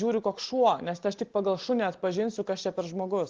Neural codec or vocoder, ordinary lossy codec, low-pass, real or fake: none; Opus, 16 kbps; 7.2 kHz; real